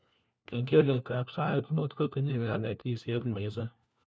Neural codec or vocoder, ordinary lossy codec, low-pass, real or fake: codec, 16 kHz, 1 kbps, FunCodec, trained on LibriTTS, 50 frames a second; none; none; fake